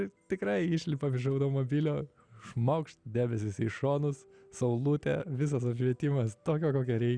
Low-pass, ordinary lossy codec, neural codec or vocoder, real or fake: 9.9 kHz; Opus, 64 kbps; none; real